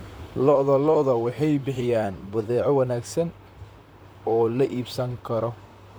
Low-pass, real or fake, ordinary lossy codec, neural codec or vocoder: none; fake; none; vocoder, 44.1 kHz, 128 mel bands, Pupu-Vocoder